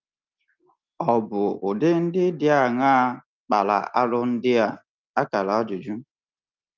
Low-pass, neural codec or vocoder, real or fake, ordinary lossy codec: 7.2 kHz; none; real; Opus, 32 kbps